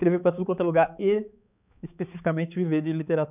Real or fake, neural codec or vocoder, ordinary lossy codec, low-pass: fake; codec, 16 kHz, 4 kbps, X-Codec, HuBERT features, trained on balanced general audio; none; 3.6 kHz